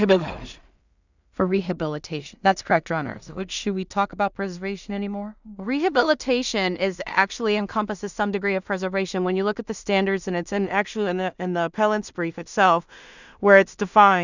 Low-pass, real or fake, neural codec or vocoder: 7.2 kHz; fake; codec, 16 kHz in and 24 kHz out, 0.4 kbps, LongCat-Audio-Codec, two codebook decoder